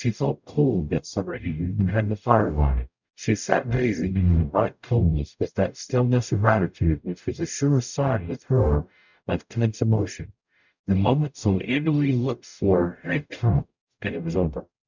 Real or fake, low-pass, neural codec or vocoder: fake; 7.2 kHz; codec, 44.1 kHz, 0.9 kbps, DAC